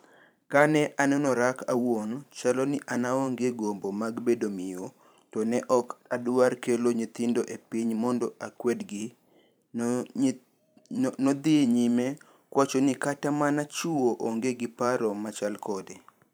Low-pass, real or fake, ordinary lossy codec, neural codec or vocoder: none; fake; none; vocoder, 44.1 kHz, 128 mel bands every 512 samples, BigVGAN v2